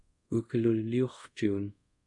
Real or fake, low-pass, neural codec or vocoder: fake; 10.8 kHz; codec, 24 kHz, 0.5 kbps, DualCodec